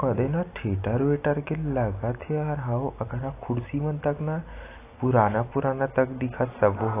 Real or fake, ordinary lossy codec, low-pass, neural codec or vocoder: real; AAC, 24 kbps; 3.6 kHz; none